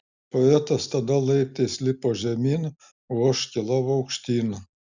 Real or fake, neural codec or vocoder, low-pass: real; none; 7.2 kHz